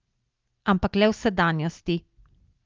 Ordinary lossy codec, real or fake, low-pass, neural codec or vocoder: Opus, 32 kbps; real; 7.2 kHz; none